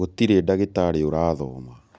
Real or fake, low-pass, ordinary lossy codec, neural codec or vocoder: real; none; none; none